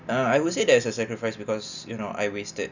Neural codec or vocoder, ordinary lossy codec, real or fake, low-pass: none; none; real; 7.2 kHz